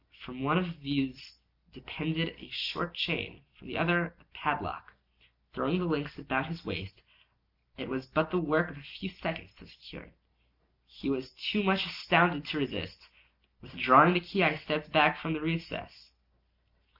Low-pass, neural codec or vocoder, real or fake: 5.4 kHz; none; real